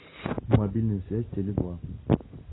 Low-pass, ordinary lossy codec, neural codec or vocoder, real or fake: 7.2 kHz; AAC, 16 kbps; none; real